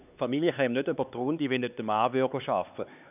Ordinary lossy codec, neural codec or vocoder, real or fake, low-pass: none; codec, 16 kHz, 4 kbps, X-Codec, HuBERT features, trained on LibriSpeech; fake; 3.6 kHz